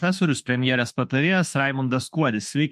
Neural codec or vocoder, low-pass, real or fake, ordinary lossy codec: autoencoder, 48 kHz, 32 numbers a frame, DAC-VAE, trained on Japanese speech; 14.4 kHz; fake; MP3, 64 kbps